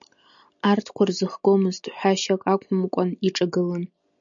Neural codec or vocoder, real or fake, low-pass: none; real; 7.2 kHz